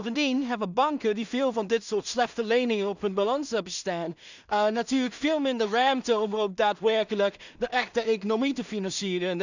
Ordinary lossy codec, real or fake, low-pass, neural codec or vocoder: none; fake; 7.2 kHz; codec, 16 kHz in and 24 kHz out, 0.4 kbps, LongCat-Audio-Codec, two codebook decoder